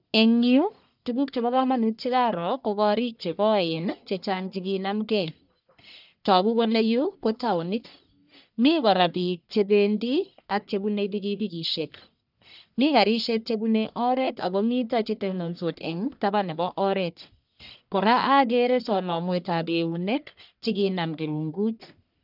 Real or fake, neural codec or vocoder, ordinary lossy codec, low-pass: fake; codec, 44.1 kHz, 1.7 kbps, Pupu-Codec; none; 5.4 kHz